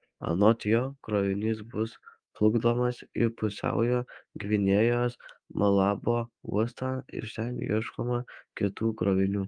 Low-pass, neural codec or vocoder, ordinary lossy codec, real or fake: 9.9 kHz; codec, 24 kHz, 3.1 kbps, DualCodec; Opus, 32 kbps; fake